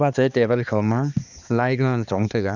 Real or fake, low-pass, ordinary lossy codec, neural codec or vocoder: fake; 7.2 kHz; none; codec, 16 kHz, 2 kbps, X-Codec, HuBERT features, trained on balanced general audio